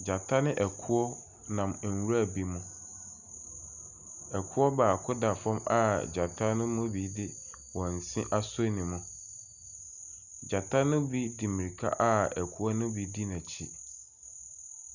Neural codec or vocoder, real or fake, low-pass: none; real; 7.2 kHz